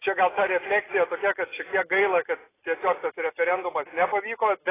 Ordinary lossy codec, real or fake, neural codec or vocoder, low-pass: AAC, 16 kbps; real; none; 3.6 kHz